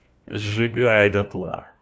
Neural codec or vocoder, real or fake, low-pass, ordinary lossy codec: codec, 16 kHz, 1 kbps, FunCodec, trained on LibriTTS, 50 frames a second; fake; none; none